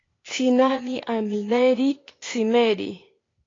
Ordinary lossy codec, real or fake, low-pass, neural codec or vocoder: AAC, 32 kbps; fake; 7.2 kHz; codec, 16 kHz, 0.8 kbps, ZipCodec